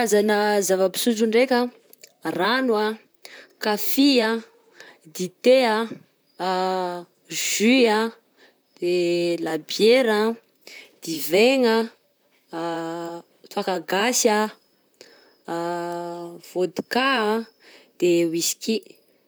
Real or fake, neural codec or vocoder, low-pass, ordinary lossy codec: fake; vocoder, 44.1 kHz, 128 mel bands, Pupu-Vocoder; none; none